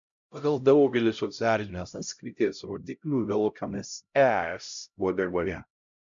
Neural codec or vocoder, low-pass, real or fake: codec, 16 kHz, 0.5 kbps, X-Codec, HuBERT features, trained on LibriSpeech; 7.2 kHz; fake